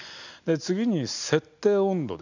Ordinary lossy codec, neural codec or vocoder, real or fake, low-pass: none; none; real; 7.2 kHz